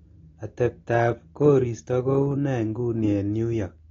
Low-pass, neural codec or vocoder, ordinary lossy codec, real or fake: 7.2 kHz; none; AAC, 32 kbps; real